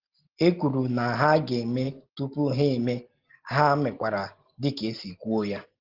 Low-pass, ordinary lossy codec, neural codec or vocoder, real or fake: 5.4 kHz; Opus, 16 kbps; none; real